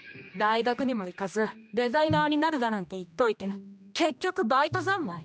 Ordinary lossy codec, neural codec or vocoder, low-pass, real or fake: none; codec, 16 kHz, 1 kbps, X-Codec, HuBERT features, trained on general audio; none; fake